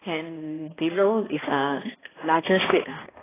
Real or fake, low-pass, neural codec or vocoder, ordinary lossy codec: fake; 3.6 kHz; codec, 16 kHz, 1 kbps, X-Codec, HuBERT features, trained on balanced general audio; AAC, 16 kbps